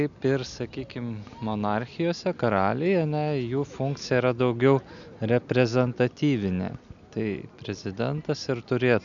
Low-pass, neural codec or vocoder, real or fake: 7.2 kHz; none; real